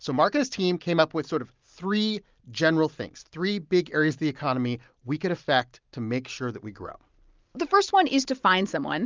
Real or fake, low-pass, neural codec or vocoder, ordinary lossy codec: real; 7.2 kHz; none; Opus, 32 kbps